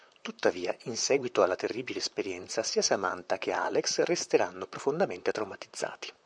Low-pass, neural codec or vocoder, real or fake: 9.9 kHz; vocoder, 44.1 kHz, 128 mel bands, Pupu-Vocoder; fake